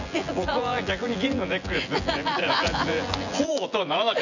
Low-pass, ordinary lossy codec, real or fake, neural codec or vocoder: 7.2 kHz; none; fake; vocoder, 24 kHz, 100 mel bands, Vocos